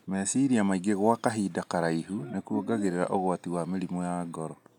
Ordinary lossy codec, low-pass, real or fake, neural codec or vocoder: none; 19.8 kHz; real; none